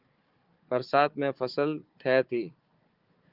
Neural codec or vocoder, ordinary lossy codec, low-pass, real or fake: codec, 16 kHz, 4 kbps, FunCodec, trained on Chinese and English, 50 frames a second; Opus, 32 kbps; 5.4 kHz; fake